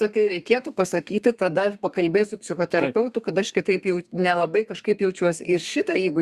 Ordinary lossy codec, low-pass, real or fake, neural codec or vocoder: Opus, 64 kbps; 14.4 kHz; fake; codec, 32 kHz, 1.9 kbps, SNAC